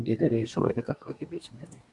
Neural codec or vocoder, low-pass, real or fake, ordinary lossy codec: codec, 24 kHz, 1 kbps, SNAC; 10.8 kHz; fake; Opus, 64 kbps